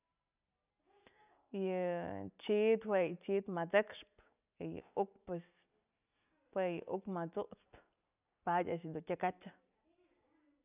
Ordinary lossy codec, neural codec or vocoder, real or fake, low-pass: none; none; real; 3.6 kHz